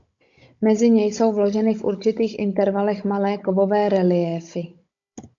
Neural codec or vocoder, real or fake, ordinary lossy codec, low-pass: codec, 16 kHz, 16 kbps, FunCodec, trained on Chinese and English, 50 frames a second; fake; AAC, 64 kbps; 7.2 kHz